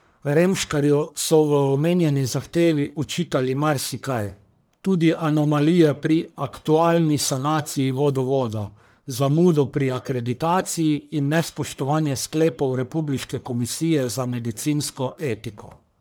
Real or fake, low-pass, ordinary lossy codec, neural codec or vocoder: fake; none; none; codec, 44.1 kHz, 1.7 kbps, Pupu-Codec